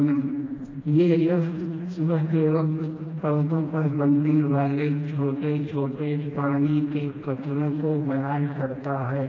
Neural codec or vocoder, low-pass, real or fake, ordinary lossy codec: codec, 16 kHz, 1 kbps, FreqCodec, smaller model; 7.2 kHz; fake; AAC, 32 kbps